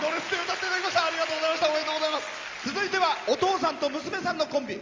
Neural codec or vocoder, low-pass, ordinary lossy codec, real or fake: none; 7.2 kHz; Opus, 32 kbps; real